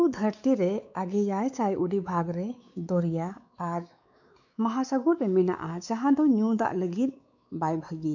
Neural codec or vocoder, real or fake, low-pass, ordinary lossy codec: codec, 16 kHz, 4 kbps, X-Codec, WavLM features, trained on Multilingual LibriSpeech; fake; 7.2 kHz; none